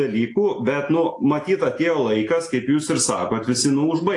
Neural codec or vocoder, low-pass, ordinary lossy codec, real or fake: none; 10.8 kHz; AAC, 48 kbps; real